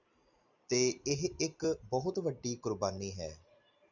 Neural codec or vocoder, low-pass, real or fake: none; 7.2 kHz; real